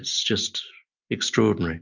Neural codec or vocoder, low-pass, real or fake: none; 7.2 kHz; real